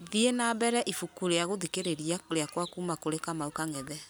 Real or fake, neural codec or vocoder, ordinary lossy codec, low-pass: real; none; none; none